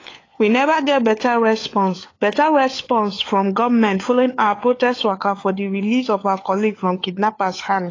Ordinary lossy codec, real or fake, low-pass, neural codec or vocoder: AAC, 32 kbps; fake; 7.2 kHz; codec, 16 kHz, 4 kbps, FunCodec, trained on LibriTTS, 50 frames a second